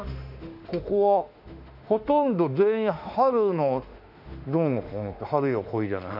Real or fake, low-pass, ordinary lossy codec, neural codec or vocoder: fake; 5.4 kHz; none; autoencoder, 48 kHz, 32 numbers a frame, DAC-VAE, trained on Japanese speech